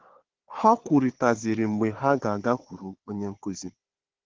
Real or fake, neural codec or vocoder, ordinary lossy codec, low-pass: fake; codec, 16 kHz, 4 kbps, FunCodec, trained on Chinese and English, 50 frames a second; Opus, 16 kbps; 7.2 kHz